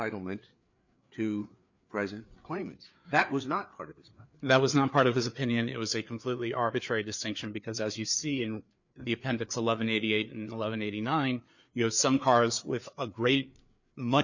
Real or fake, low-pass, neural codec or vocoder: fake; 7.2 kHz; codec, 16 kHz, 4 kbps, FreqCodec, larger model